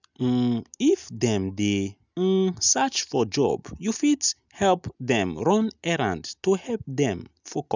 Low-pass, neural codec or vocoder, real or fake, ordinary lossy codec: 7.2 kHz; none; real; none